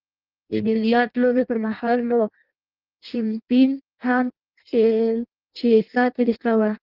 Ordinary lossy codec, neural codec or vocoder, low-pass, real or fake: Opus, 24 kbps; codec, 16 kHz in and 24 kHz out, 0.6 kbps, FireRedTTS-2 codec; 5.4 kHz; fake